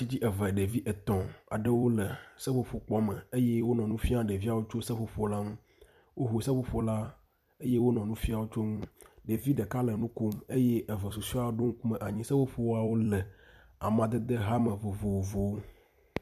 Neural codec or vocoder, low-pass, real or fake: vocoder, 44.1 kHz, 128 mel bands every 256 samples, BigVGAN v2; 14.4 kHz; fake